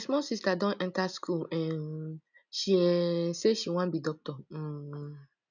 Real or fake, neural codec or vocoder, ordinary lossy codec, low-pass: real; none; none; 7.2 kHz